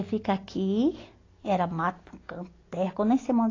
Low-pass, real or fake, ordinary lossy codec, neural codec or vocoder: 7.2 kHz; real; AAC, 32 kbps; none